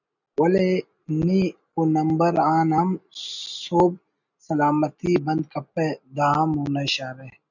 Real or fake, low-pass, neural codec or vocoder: real; 7.2 kHz; none